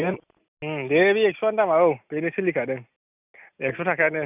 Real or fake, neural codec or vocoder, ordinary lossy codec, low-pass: real; none; none; 3.6 kHz